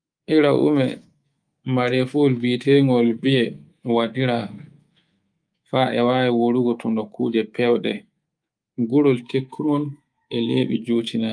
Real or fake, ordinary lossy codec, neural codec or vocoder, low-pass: fake; Opus, 32 kbps; codec, 24 kHz, 3.1 kbps, DualCodec; 9.9 kHz